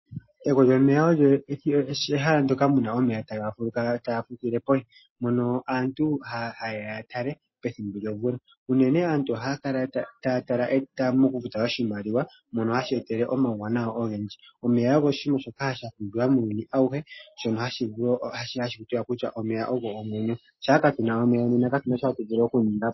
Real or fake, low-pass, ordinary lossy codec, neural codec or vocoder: real; 7.2 kHz; MP3, 24 kbps; none